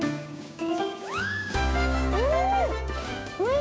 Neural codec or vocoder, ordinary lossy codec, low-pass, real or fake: codec, 16 kHz, 6 kbps, DAC; none; none; fake